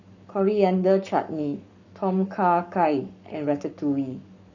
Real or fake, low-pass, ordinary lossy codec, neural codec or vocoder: fake; 7.2 kHz; none; codec, 44.1 kHz, 7.8 kbps, Pupu-Codec